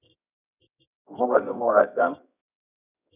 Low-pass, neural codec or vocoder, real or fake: 3.6 kHz; codec, 24 kHz, 0.9 kbps, WavTokenizer, medium music audio release; fake